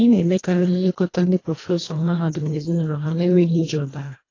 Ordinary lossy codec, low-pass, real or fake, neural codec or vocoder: AAC, 32 kbps; 7.2 kHz; fake; codec, 24 kHz, 1.5 kbps, HILCodec